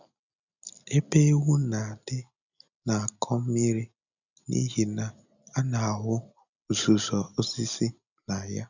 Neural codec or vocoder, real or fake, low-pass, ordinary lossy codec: none; real; 7.2 kHz; none